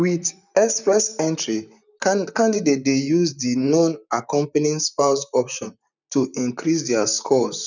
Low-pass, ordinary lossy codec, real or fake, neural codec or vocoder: 7.2 kHz; none; fake; vocoder, 44.1 kHz, 128 mel bands, Pupu-Vocoder